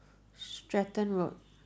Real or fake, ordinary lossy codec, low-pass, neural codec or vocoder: real; none; none; none